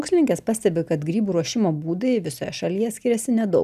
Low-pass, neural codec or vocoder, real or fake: 14.4 kHz; none; real